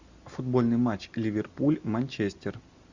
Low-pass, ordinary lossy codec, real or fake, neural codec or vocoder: 7.2 kHz; Opus, 64 kbps; real; none